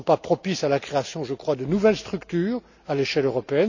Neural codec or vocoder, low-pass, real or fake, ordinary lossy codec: none; 7.2 kHz; real; none